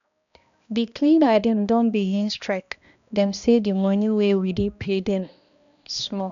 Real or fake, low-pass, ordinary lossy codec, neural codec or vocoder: fake; 7.2 kHz; none; codec, 16 kHz, 1 kbps, X-Codec, HuBERT features, trained on balanced general audio